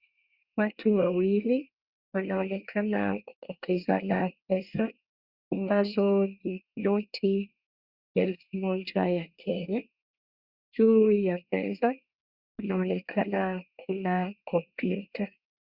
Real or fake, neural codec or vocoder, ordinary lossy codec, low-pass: fake; codec, 24 kHz, 1 kbps, SNAC; Opus, 64 kbps; 5.4 kHz